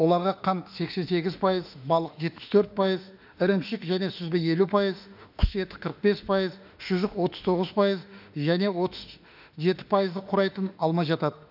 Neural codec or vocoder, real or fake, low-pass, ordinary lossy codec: autoencoder, 48 kHz, 32 numbers a frame, DAC-VAE, trained on Japanese speech; fake; 5.4 kHz; none